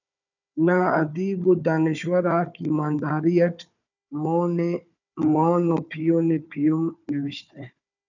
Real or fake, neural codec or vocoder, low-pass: fake; codec, 16 kHz, 4 kbps, FunCodec, trained on Chinese and English, 50 frames a second; 7.2 kHz